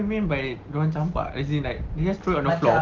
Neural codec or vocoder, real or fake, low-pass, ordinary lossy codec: none; real; 7.2 kHz; Opus, 16 kbps